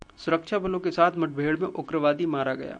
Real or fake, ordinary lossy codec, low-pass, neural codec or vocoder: real; MP3, 96 kbps; 9.9 kHz; none